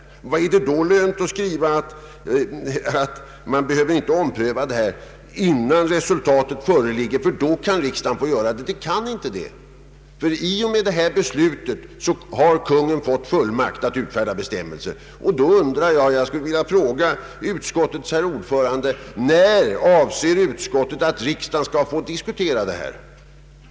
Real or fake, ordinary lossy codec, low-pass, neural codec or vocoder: real; none; none; none